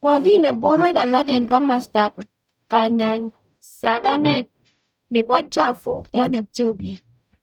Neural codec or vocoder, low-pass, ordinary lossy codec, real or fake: codec, 44.1 kHz, 0.9 kbps, DAC; 19.8 kHz; none; fake